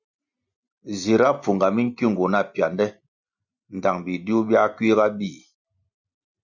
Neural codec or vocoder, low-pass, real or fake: none; 7.2 kHz; real